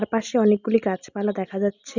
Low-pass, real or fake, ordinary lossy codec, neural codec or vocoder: 7.2 kHz; real; none; none